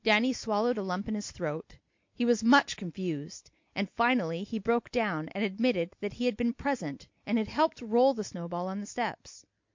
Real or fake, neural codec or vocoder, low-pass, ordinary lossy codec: real; none; 7.2 kHz; MP3, 48 kbps